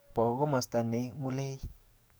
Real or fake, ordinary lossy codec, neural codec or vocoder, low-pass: fake; none; codec, 44.1 kHz, 7.8 kbps, DAC; none